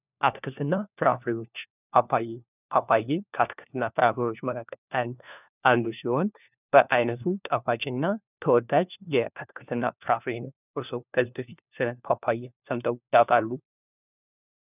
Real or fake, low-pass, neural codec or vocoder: fake; 3.6 kHz; codec, 16 kHz, 1 kbps, FunCodec, trained on LibriTTS, 50 frames a second